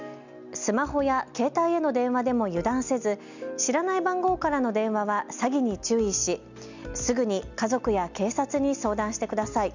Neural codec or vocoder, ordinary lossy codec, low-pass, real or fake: none; none; 7.2 kHz; real